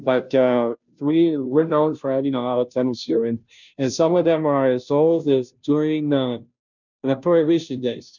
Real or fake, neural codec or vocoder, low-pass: fake; codec, 16 kHz, 0.5 kbps, FunCodec, trained on Chinese and English, 25 frames a second; 7.2 kHz